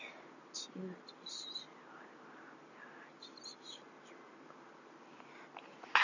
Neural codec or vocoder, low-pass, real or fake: none; 7.2 kHz; real